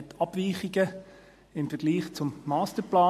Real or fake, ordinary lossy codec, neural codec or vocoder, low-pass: real; MP3, 64 kbps; none; 14.4 kHz